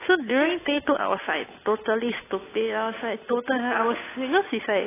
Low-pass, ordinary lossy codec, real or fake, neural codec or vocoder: 3.6 kHz; AAC, 16 kbps; fake; codec, 16 kHz, 8 kbps, FunCodec, trained on Chinese and English, 25 frames a second